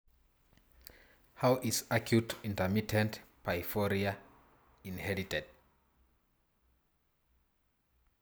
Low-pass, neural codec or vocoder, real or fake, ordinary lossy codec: none; none; real; none